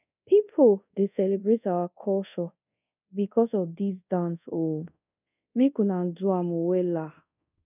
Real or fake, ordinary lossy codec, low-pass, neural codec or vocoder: fake; none; 3.6 kHz; codec, 24 kHz, 0.5 kbps, DualCodec